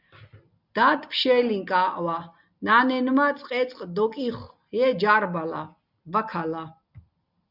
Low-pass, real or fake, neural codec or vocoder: 5.4 kHz; real; none